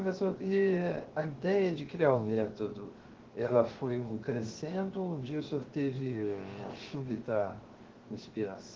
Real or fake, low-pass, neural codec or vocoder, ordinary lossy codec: fake; 7.2 kHz; codec, 16 kHz, 0.7 kbps, FocalCodec; Opus, 16 kbps